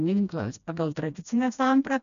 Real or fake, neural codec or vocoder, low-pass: fake; codec, 16 kHz, 1 kbps, FreqCodec, smaller model; 7.2 kHz